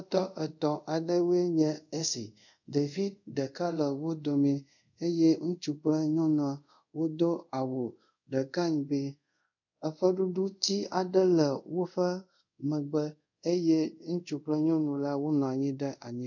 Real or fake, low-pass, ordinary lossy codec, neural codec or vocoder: fake; 7.2 kHz; MP3, 64 kbps; codec, 24 kHz, 0.5 kbps, DualCodec